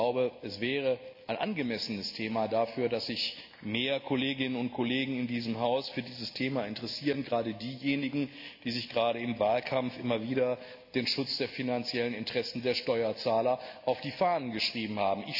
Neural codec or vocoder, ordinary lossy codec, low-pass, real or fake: none; AAC, 48 kbps; 5.4 kHz; real